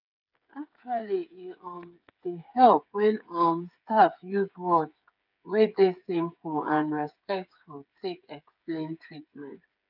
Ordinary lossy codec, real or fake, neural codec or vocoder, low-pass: none; fake; codec, 16 kHz, 8 kbps, FreqCodec, smaller model; 5.4 kHz